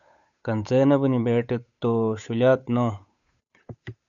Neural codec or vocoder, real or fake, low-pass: codec, 16 kHz, 8 kbps, FunCodec, trained on Chinese and English, 25 frames a second; fake; 7.2 kHz